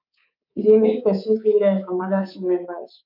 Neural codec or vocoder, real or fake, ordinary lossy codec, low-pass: codec, 24 kHz, 3.1 kbps, DualCodec; fake; none; 5.4 kHz